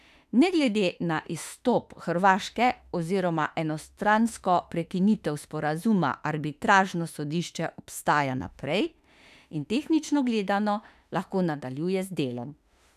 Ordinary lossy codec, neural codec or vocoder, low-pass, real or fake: none; autoencoder, 48 kHz, 32 numbers a frame, DAC-VAE, trained on Japanese speech; 14.4 kHz; fake